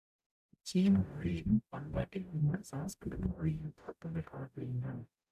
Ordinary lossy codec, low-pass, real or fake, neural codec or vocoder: none; 14.4 kHz; fake; codec, 44.1 kHz, 0.9 kbps, DAC